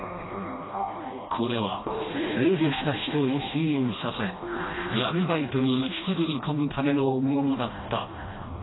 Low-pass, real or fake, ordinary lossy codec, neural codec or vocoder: 7.2 kHz; fake; AAC, 16 kbps; codec, 16 kHz, 1 kbps, FreqCodec, smaller model